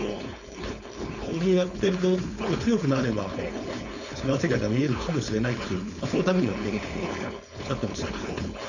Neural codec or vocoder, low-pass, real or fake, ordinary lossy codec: codec, 16 kHz, 4.8 kbps, FACodec; 7.2 kHz; fake; none